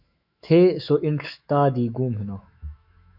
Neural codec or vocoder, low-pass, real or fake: autoencoder, 48 kHz, 128 numbers a frame, DAC-VAE, trained on Japanese speech; 5.4 kHz; fake